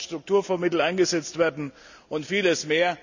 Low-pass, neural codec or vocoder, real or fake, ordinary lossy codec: 7.2 kHz; none; real; none